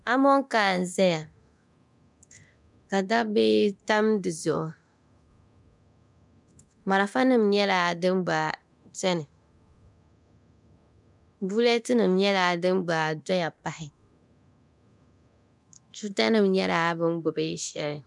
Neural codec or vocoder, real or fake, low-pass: codec, 24 kHz, 0.9 kbps, DualCodec; fake; 10.8 kHz